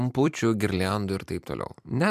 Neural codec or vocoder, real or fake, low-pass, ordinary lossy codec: vocoder, 44.1 kHz, 128 mel bands every 256 samples, BigVGAN v2; fake; 14.4 kHz; MP3, 96 kbps